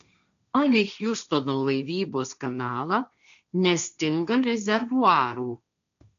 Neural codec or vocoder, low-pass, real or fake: codec, 16 kHz, 1.1 kbps, Voila-Tokenizer; 7.2 kHz; fake